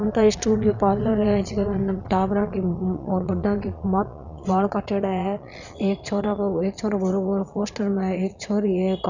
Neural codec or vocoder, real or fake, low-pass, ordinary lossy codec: vocoder, 22.05 kHz, 80 mel bands, Vocos; fake; 7.2 kHz; none